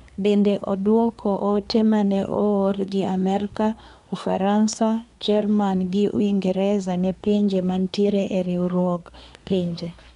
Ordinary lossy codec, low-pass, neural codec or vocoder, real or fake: none; 10.8 kHz; codec, 24 kHz, 1 kbps, SNAC; fake